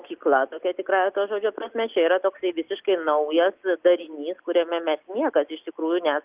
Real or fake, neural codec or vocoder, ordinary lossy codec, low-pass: real; none; Opus, 32 kbps; 3.6 kHz